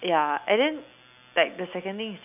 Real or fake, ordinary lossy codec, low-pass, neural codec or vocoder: real; none; 3.6 kHz; none